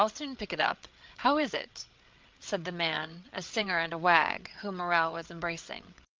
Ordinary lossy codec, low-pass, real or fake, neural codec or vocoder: Opus, 24 kbps; 7.2 kHz; fake; codec, 16 kHz, 8 kbps, FreqCodec, larger model